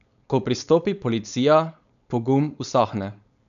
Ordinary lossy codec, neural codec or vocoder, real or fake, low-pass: none; codec, 16 kHz, 4.8 kbps, FACodec; fake; 7.2 kHz